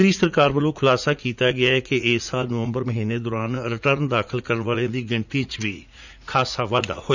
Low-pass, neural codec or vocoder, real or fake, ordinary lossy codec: 7.2 kHz; vocoder, 44.1 kHz, 80 mel bands, Vocos; fake; none